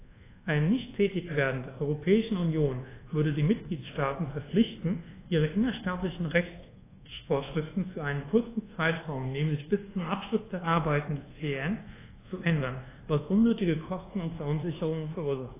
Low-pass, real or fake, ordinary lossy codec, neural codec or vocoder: 3.6 kHz; fake; AAC, 16 kbps; codec, 24 kHz, 1.2 kbps, DualCodec